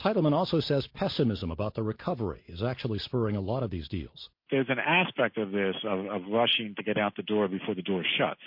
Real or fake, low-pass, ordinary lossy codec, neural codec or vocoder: real; 5.4 kHz; MP3, 32 kbps; none